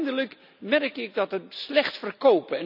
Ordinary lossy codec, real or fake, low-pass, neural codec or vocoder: none; real; 5.4 kHz; none